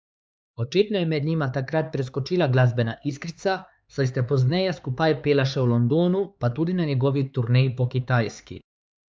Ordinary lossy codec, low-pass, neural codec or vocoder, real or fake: none; none; codec, 16 kHz, 4 kbps, X-Codec, HuBERT features, trained on LibriSpeech; fake